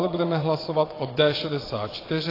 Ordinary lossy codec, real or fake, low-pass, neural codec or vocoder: AAC, 24 kbps; fake; 5.4 kHz; vocoder, 22.05 kHz, 80 mel bands, WaveNeXt